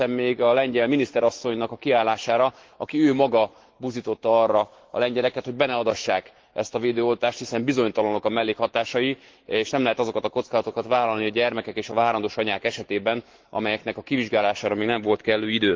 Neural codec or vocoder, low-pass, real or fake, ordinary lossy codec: autoencoder, 48 kHz, 128 numbers a frame, DAC-VAE, trained on Japanese speech; 7.2 kHz; fake; Opus, 16 kbps